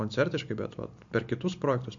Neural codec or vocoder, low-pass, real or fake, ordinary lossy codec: none; 7.2 kHz; real; MP3, 48 kbps